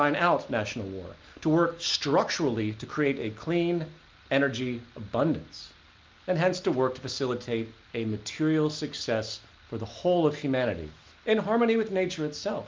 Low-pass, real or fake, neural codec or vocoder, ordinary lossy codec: 7.2 kHz; real; none; Opus, 32 kbps